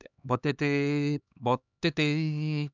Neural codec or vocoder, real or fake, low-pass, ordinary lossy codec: codec, 16 kHz, 2 kbps, X-Codec, HuBERT features, trained on LibriSpeech; fake; 7.2 kHz; none